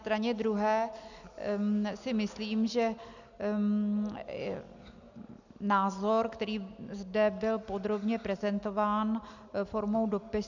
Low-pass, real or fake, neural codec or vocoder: 7.2 kHz; real; none